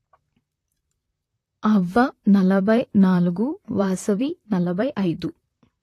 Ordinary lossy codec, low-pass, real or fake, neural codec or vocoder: AAC, 48 kbps; 14.4 kHz; fake; vocoder, 44.1 kHz, 128 mel bands, Pupu-Vocoder